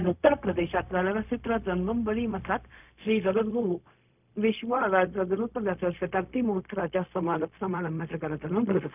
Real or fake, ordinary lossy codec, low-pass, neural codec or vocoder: fake; none; 3.6 kHz; codec, 16 kHz, 0.4 kbps, LongCat-Audio-Codec